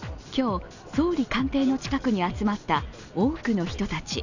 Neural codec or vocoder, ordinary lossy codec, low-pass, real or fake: none; none; 7.2 kHz; real